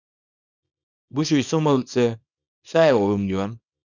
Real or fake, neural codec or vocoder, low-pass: fake; codec, 24 kHz, 0.9 kbps, WavTokenizer, small release; 7.2 kHz